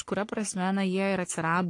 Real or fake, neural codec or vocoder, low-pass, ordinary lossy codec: fake; codec, 44.1 kHz, 3.4 kbps, Pupu-Codec; 10.8 kHz; AAC, 48 kbps